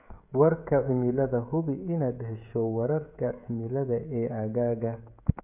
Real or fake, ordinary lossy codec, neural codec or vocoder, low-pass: fake; none; codec, 16 kHz, 16 kbps, FreqCodec, smaller model; 3.6 kHz